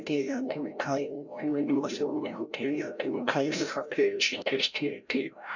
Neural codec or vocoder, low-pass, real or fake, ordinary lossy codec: codec, 16 kHz, 0.5 kbps, FreqCodec, larger model; 7.2 kHz; fake; none